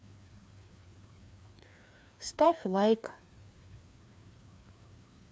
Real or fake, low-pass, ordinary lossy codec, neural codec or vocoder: fake; none; none; codec, 16 kHz, 2 kbps, FreqCodec, larger model